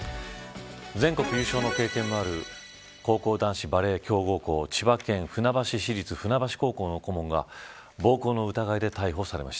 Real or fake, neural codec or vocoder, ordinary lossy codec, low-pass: real; none; none; none